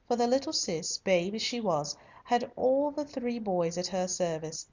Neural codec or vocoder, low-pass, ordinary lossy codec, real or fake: none; 7.2 kHz; MP3, 64 kbps; real